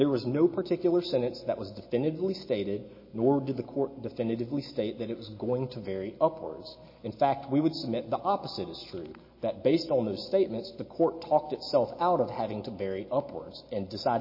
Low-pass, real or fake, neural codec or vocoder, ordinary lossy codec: 5.4 kHz; real; none; MP3, 24 kbps